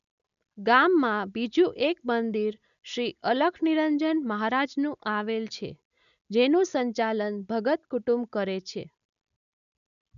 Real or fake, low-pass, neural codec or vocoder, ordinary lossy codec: real; 7.2 kHz; none; none